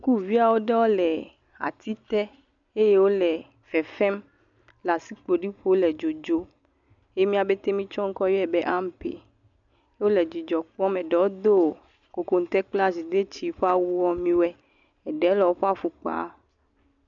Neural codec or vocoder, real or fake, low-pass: none; real; 7.2 kHz